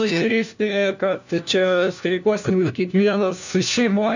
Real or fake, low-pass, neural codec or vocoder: fake; 7.2 kHz; codec, 16 kHz, 1 kbps, FunCodec, trained on LibriTTS, 50 frames a second